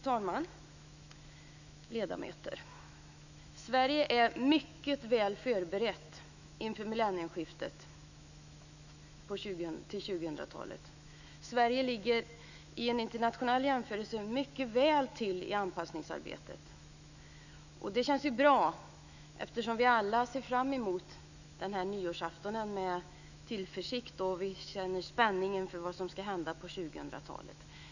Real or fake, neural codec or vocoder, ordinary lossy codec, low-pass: fake; autoencoder, 48 kHz, 128 numbers a frame, DAC-VAE, trained on Japanese speech; none; 7.2 kHz